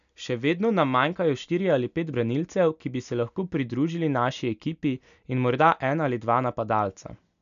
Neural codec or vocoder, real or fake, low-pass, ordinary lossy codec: none; real; 7.2 kHz; none